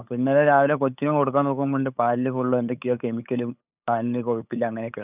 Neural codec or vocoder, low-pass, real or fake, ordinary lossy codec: codec, 16 kHz, 8 kbps, FunCodec, trained on LibriTTS, 25 frames a second; 3.6 kHz; fake; none